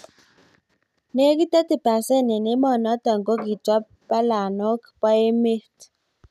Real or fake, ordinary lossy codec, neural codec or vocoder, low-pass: real; none; none; 14.4 kHz